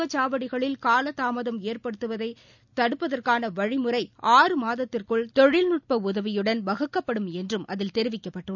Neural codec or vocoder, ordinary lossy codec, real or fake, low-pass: none; none; real; 7.2 kHz